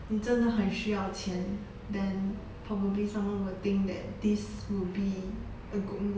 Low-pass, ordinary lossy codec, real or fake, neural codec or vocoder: none; none; real; none